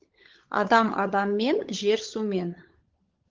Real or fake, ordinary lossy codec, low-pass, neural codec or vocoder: fake; Opus, 16 kbps; 7.2 kHz; codec, 16 kHz, 16 kbps, FunCodec, trained on LibriTTS, 50 frames a second